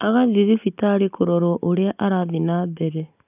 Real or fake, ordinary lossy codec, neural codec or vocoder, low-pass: real; none; none; 3.6 kHz